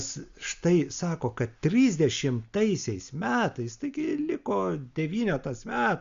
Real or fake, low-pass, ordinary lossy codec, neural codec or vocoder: real; 7.2 kHz; Opus, 64 kbps; none